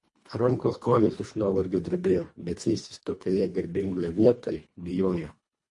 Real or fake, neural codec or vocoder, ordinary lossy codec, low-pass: fake; codec, 24 kHz, 1.5 kbps, HILCodec; MP3, 48 kbps; 10.8 kHz